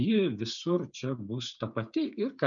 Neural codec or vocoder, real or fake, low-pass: codec, 16 kHz, 8 kbps, FreqCodec, smaller model; fake; 7.2 kHz